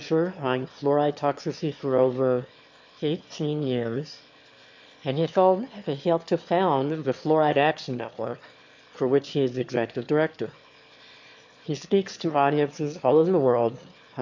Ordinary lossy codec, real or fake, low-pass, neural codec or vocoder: MP3, 48 kbps; fake; 7.2 kHz; autoencoder, 22.05 kHz, a latent of 192 numbers a frame, VITS, trained on one speaker